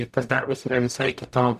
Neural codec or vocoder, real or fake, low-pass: codec, 44.1 kHz, 0.9 kbps, DAC; fake; 14.4 kHz